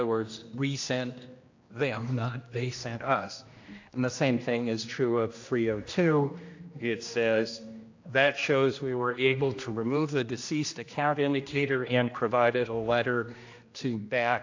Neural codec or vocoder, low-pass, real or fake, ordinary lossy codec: codec, 16 kHz, 1 kbps, X-Codec, HuBERT features, trained on general audio; 7.2 kHz; fake; AAC, 48 kbps